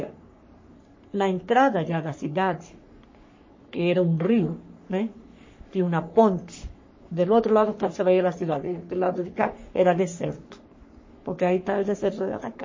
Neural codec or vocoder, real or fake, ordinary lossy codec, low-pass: codec, 44.1 kHz, 3.4 kbps, Pupu-Codec; fake; MP3, 32 kbps; 7.2 kHz